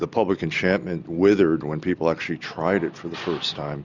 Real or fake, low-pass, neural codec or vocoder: real; 7.2 kHz; none